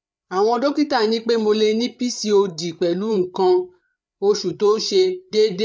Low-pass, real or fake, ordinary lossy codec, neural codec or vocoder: none; fake; none; codec, 16 kHz, 8 kbps, FreqCodec, larger model